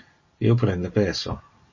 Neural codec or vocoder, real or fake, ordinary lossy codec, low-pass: none; real; MP3, 32 kbps; 7.2 kHz